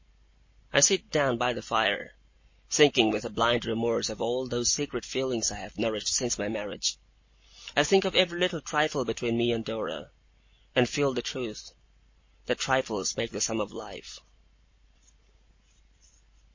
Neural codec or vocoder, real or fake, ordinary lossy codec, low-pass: none; real; MP3, 32 kbps; 7.2 kHz